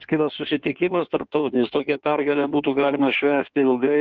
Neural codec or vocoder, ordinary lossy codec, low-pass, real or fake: codec, 16 kHz in and 24 kHz out, 1.1 kbps, FireRedTTS-2 codec; Opus, 24 kbps; 7.2 kHz; fake